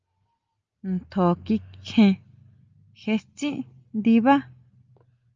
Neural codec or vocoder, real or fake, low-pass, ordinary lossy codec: none; real; 7.2 kHz; Opus, 24 kbps